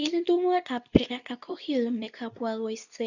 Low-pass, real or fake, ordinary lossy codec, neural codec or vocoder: 7.2 kHz; fake; none; codec, 24 kHz, 0.9 kbps, WavTokenizer, medium speech release version 2